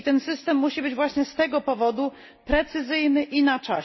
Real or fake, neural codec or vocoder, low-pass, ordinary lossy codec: real; none; 7.2 kHz; MP3, 24 kbps